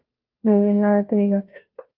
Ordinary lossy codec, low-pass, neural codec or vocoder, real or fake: Opus, 32 kbps; 5.4 kHz; codec, 16 kHz, 0.5 kbps, FunCodec, trained on Chinese and English, 25 frames a second; fake